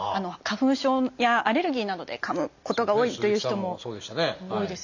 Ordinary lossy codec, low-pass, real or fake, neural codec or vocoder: none; 7.2 kHz; real; none